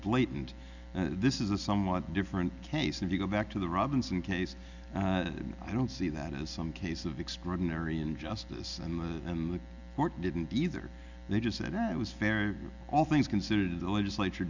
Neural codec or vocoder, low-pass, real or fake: none; 7.2 kHz; real